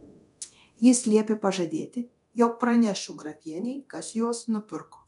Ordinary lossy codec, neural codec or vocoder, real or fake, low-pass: MP3, 96 kbps; codec, 24 kHz, 0.9 kbps, DualCodec; fake; 10.8 kHz